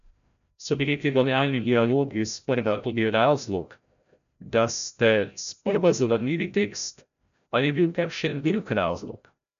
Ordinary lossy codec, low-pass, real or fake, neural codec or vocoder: none; 7.2 kHz; fake; codec, 16 kHz, 0.5 kbps, FreqCodec, larger model